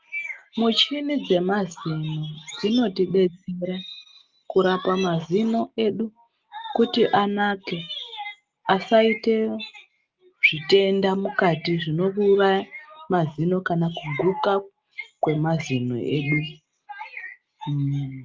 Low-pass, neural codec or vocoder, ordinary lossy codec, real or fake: 7.2 kHz; none; Opus, 24 kbps; real